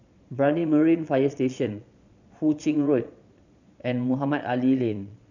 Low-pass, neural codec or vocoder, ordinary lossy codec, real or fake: 7.2 kHz; vocoder, 22.05 kHz, 80 mel bands, Vocos; none; fake